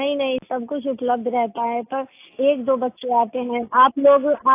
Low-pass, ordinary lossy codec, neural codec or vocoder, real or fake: 3.6 kHz; AAC, 24 kbps; none; real